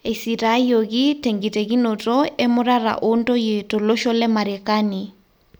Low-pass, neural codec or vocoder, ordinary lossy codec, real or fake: none; none; none; real